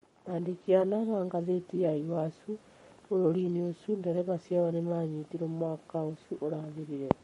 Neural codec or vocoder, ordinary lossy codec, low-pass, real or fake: codec, 44.1 kHz, 7.8 kbps, Pupu-Codec; MP3, 48 kbps; 19.8 kHz; fake